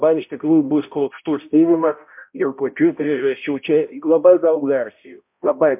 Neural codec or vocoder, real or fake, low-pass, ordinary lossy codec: codec, 16 kHz, 0.5 kbps, X-Codec, HuBERT features, trained on balanced general audio; fake; 3.6 kHz; MP3, 32 kbps